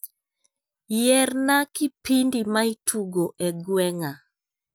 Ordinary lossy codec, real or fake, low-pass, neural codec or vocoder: none; real; none; none